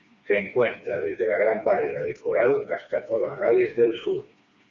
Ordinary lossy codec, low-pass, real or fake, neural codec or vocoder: Opus, 64 kbps; 7.2 kHz; fake; codec, 16 kHz, 2 kbps, FreqCodec, smaller model